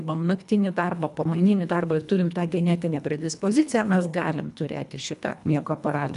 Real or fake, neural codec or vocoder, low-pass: fake; codec, 24 kHz, 1.5 kbps, HILCodec; 10.8 kHz